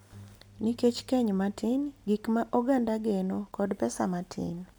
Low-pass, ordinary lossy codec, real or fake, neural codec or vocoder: none; none; real; none